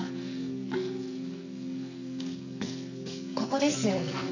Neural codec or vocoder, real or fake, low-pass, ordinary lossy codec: codec, 44.1 kHz, 2.6 kbps, SNAC; fake; 7.2 kHz; none